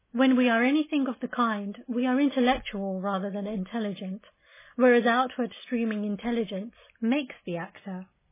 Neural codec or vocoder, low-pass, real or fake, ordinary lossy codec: none; 3.6 kHz; real; MP3, 16 kbps